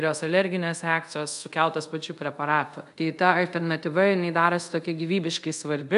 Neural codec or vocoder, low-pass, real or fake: codec, 24 kHz, 0.5 kbps, DualCodec; 10.8 kHz; fake